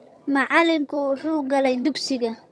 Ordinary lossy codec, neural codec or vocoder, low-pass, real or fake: none; vocoder, 22.05 kHz, 80 mel bands, HiFi-GAN; none; fake